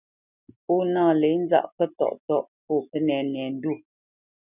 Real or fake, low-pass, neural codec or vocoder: real; 3.6 kHz; none